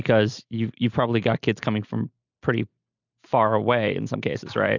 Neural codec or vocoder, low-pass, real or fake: none; 7.2 kHz; real